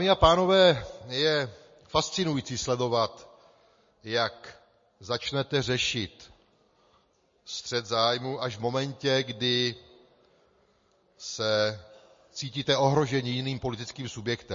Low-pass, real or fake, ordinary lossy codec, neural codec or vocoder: 7.2 kHz; real; MP3, 32 kbps; none